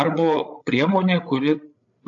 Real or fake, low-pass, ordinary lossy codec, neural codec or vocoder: fake; 7.2 kHz; AAC, 64 kbps; codec, 16 kHz, 8 kbps, FreqCodec, larger model